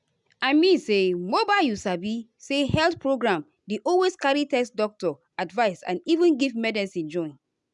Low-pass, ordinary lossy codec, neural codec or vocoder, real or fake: 10.8 kHz; none; none; real